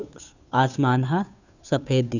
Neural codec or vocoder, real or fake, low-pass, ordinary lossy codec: codec, 16 kHz, 2 kbps, FunCodec, trained on Chinese and English, 25 frames a second; fake; 7.2 kHz; none